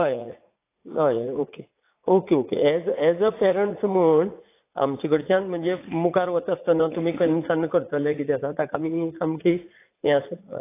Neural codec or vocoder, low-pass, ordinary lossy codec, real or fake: vocoder, 44.1 kHz, 80 mel bands, Vocos; 3.6 kHz; AAC, 24 kbps; fake